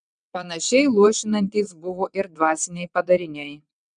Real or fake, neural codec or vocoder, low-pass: fake; vocoder, 22.05 kHz, 80 mel bands, WaveNeXt; 9.9 kHz